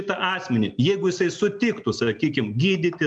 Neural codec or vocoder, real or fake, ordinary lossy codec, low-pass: none; real; MP3, 96 kbps; 9.9 kHz